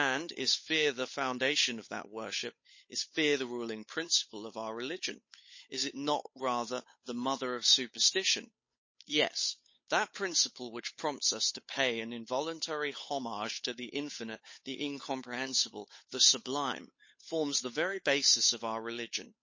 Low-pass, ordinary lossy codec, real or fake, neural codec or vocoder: 7.2 kHz; MP3, 32 kbps; fake; codec, 16 kHz, 8 kbps, FunCodec, trained on Chinese and English, 25 frames a second